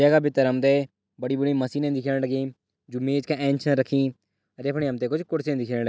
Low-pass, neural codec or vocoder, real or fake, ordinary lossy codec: none; none; real; none